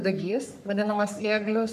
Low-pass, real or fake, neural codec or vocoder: 14.4 kHz; fake; codec, 44.1 kHz, 3.4 kbps, Pupu-Codec